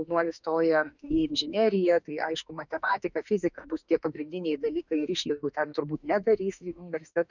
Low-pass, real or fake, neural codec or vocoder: 7.2 kHz; fake; autoencoder, 48 kHz, 32 numbers a frame, DAC-VAE, trained on Japanese speech